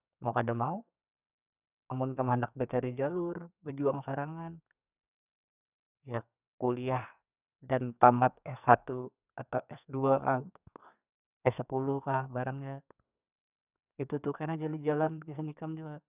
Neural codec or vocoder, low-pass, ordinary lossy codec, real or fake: codec, 44.1 kHz, 2.6 kbps, SNAC; 3.6 kHz; none; fake